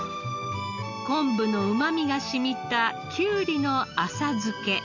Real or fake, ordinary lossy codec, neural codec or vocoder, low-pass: real; Opus, 64 kbps; none; 7.2 kHz